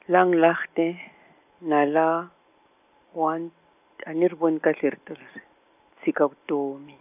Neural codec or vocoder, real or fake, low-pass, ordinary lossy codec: none; real; 3.6 kHz; none